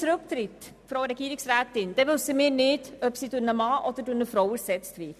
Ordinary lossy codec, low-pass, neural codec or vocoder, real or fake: none; 14.4 kHz; none; real